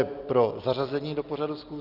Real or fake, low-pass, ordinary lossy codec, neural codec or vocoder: real; 5.4 kHz; Opus, 32 kbps; none